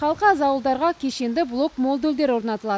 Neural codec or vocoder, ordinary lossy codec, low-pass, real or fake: none; none; none; real